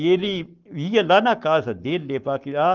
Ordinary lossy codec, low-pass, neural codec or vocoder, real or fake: Opus, 24 kbps; 7.2 kHz; codec, 44.1 kHz, 7.8 kbps, Pupu-Codec; fake